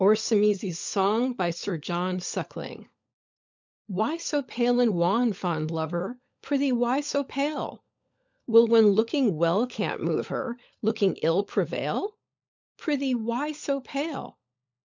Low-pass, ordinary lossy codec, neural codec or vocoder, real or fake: 7.2 kHz; MP3, 64 kbps; codec, 16 kHz, 16 kbps, FunCodec, trained on LibriTTS, 50 frames a second; fake